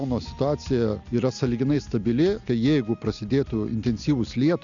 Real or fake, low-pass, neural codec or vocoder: real; 7.2 kHz; none